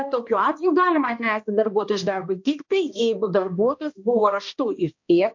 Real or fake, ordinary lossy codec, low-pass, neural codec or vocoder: fake; MP3, 48 kbps; 7.2 kHz; codec, 16 kHz, 1 kbps, X-Codec, HuBERT features, trained on balanced general audio